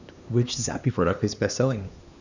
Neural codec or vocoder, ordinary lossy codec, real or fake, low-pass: codec, 16 kHz, 2 kbps, X-Codec, HuBERT features, trained on LibriSpeech; none; fake; 7.2 kHz